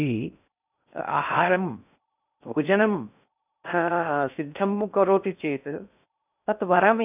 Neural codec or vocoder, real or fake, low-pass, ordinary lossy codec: codec, 16 kHz in and 24 kHz out, 0.6 kbps, FocalCodec, streaming, 2048 codes; fake; 3.6 kHz; none